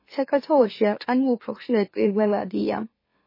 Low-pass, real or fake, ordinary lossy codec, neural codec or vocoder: 5.4 kHz; fake; MP3, 24 kbps; autoencoder, 44.1 kHz, a latent of 192 numbers a frame, MeloTTS